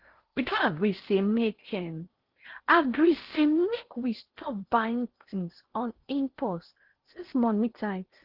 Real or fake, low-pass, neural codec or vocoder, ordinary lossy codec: fake; 5.4 kHz; codec, 16 kHz in and 24 kHz out, 0.6 kbps, FocalCodec, streaming, 4096 codes; Opus, 16 kbps